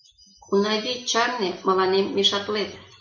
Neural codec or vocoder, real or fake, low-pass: none; real; 7.2 kHz